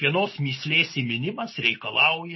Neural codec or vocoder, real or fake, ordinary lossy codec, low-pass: none; real; MP3, 24 kbps; 7.2 kHz